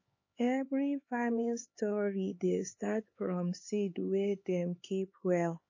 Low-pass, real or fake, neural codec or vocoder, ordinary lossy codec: 7.2 kHz; fake; codec, 16 kHz, 4 kbps, X-Codec, HuBERT features, trained on LibriSpeech; MP3, 32 kbps